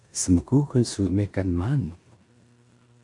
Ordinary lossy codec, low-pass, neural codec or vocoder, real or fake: AAC, 64 kbps; 10.8 kHz; codec, 16 kHz in and 24 kHz out, 0.9 kbps, LongCat-Audio-Codec, four codebook decoder; fake